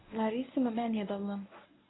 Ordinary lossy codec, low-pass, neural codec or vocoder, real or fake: AAC, 16 kbps; 7.2 kHz; codec, 24 kHz, 0.9 kbps, WavTokenizer, medium speech release version 1; fake